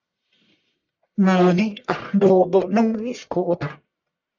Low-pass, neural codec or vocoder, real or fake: 7.2 kHz; codec, 44.1 kHz, 1.7 kbps, Pupu-Codec; fake